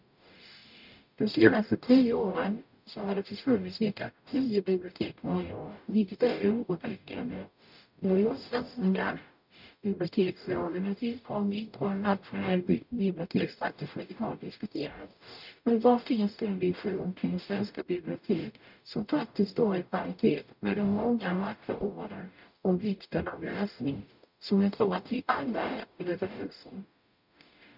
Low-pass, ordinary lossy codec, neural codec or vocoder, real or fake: 5.4 kHz; none; codec, 44.1 kHz, 0.9 kbps, DAC; fake